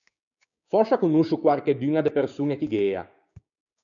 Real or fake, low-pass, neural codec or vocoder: fake; 7.2 kHz; codec, 16 kHz, 6 kbps, DAC